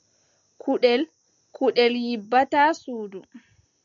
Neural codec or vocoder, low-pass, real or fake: none; 7.2 kHz; real